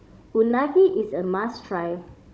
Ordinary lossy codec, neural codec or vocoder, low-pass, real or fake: none; codec, 16 kHz, 16 kbps, FunCodec, trained on Chinese and English, 50 frames a second; none; fake